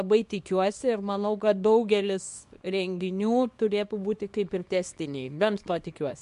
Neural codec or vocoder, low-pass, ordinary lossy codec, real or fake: codec, 24 kHz, 0.9 kbps, WavTokenizer, small release; 10.8 kHz; MP3, 64 kbps; fake